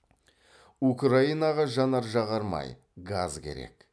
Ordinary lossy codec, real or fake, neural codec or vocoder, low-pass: none; real; none; none